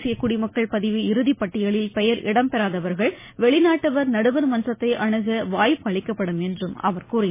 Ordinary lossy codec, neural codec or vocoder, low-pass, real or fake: MP3, 16 kbps; none; 3.6 kHz; real